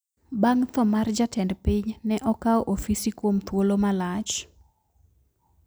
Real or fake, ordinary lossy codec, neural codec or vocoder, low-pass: real; none; none; none